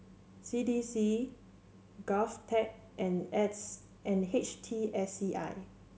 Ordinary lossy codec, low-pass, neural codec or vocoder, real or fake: none; none; none; real